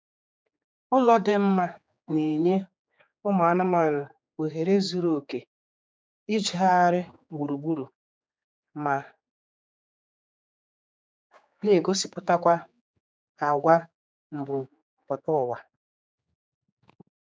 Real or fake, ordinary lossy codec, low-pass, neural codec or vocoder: fake; none; none; codec, 16 kHz, 4 kbps, X-Codec, HuBERT features, trained on general audio